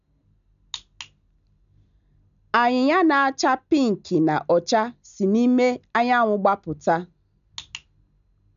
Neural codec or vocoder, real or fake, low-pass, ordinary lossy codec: none; real; 7.2 kHz; none